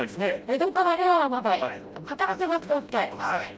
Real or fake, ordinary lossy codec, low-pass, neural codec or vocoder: fake; none; none; codec, 16 kHz, 0.5 kbps, FreqCodec, smaller model